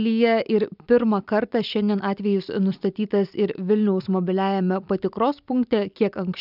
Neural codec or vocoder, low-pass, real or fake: none; 5.4 kHz; real